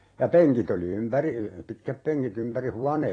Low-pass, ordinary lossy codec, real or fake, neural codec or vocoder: 9.9 kHz; AAC, 32 kbps; fake; vocoder, 44.1 kHz, 128 mel bands every 256 samples, BigVGAN v2